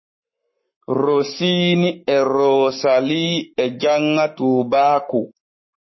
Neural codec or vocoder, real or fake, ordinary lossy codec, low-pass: codec, 44.1 kHz, 7.8 kbps, Pupu-Codec; fake; MP3, 24 kbps; 7.2 kHz